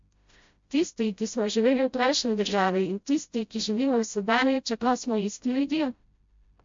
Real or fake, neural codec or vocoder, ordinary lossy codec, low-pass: fake; codec, 16 kHz, 0.5 kbps, FreqCodec, smaller model; MP3, 48 kbps; 7.2 kHz